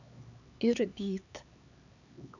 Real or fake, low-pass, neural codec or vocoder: fake; 7.2 kHz; codec, 16 kHz, 4 kbps, X-Codec, HuBERT features, trained on LibriSpeech